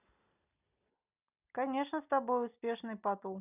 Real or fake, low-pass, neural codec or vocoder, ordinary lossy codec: real; 3.6 kHz; none; none